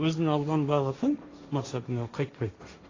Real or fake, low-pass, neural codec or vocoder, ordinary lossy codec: fake; 7.2 kHz; codec, 16 kHz, 1.1 kbps, Voila-Tokenizer; AAC, 32 kbps